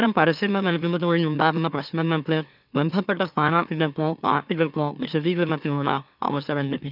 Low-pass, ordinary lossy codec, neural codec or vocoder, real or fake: 5.4 kHz; none; autoencoder, 44.1 kHz, a latent of 192 numbers a frame, MeloTTS; fake